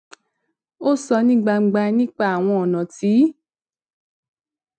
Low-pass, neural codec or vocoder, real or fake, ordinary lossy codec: 9.9 kHz; none; real; MP3, 96 kbps